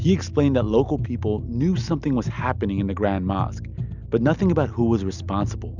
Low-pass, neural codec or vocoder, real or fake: 7.2 kHz; none; real